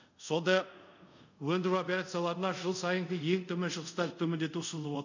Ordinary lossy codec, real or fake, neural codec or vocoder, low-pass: none; fake; codec, 24 kHz, 0.5 kbps, DualCodec; 7.2 kHz